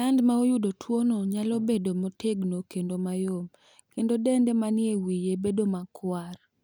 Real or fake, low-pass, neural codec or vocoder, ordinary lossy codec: real; none; none; none